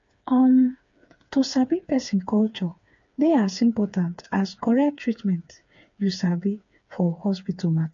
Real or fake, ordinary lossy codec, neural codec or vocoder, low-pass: fake; MP3, 48 kbps; codec, 16 kHz, 4 kbps, FreqCodec, smaller model; 7.2 kHz